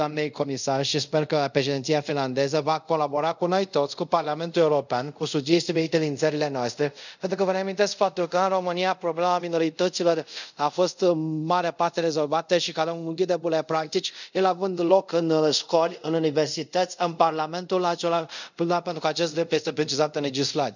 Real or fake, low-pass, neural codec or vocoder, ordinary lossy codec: fake; 7.2 kHz; codec, 24 kHz, 0.5 kbps, DualCodec; none